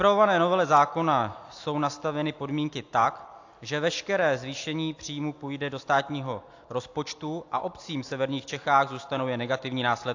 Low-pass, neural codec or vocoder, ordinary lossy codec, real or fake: 7.2 kHz; none; AAC, 48 kbps; real